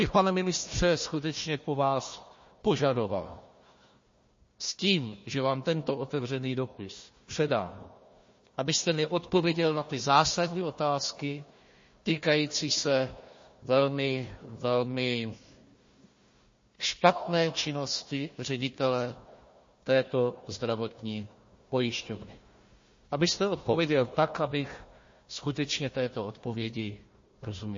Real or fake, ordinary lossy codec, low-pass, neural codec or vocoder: fake; MP3, 32 kbps; 7.2 kHz; codec, 16 kHz, 1 kbps, FunCodec, trained on Chinese and English, 50 frames a second